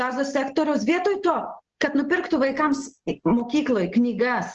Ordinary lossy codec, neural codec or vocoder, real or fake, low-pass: Opus, 16 kbps; none; real; 7.2 kHz